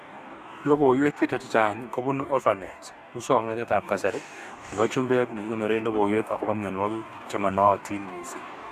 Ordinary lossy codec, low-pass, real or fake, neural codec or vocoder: none; 14.4 kHz; fake; codec, 44.1 kHz, 2.6 kbps, DAC